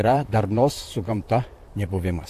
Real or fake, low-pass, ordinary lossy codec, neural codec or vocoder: fake; 14.4 kHz; AAC, 64 kbps; vocoder, 44.1 kHz, 128 mel bands, Pupu-Vocoder